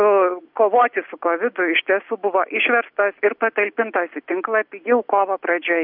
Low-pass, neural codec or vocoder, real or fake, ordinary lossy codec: 5.4 kHz; none; real; MP3, 48 kbps